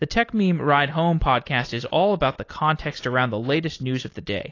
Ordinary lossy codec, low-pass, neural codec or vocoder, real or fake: AAC, 32 kbps; 7.2 kHz; none; real